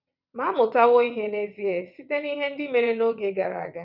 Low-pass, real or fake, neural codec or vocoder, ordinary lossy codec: 5.4 kHz; fake; vocoder, 22.05 kHz, 80 mel bands, WaveNeXt; none